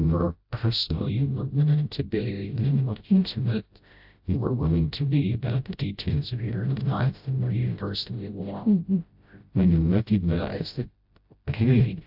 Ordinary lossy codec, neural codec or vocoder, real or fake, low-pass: AAC, 48 kbps; codec, 16 kHz, 0.5 kbps, FreqCodec, smaller model; fake; 5.4 kHz